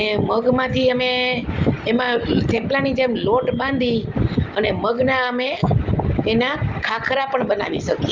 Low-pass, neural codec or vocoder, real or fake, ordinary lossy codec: 7.2 kHz; none; real; Opus, 32 kbps